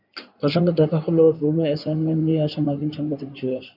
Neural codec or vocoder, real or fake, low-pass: codec, 16 kHz in and 24 kHz out, 2.2 kbps, FireRedTTS-2 codec; fake; 5.4 kHz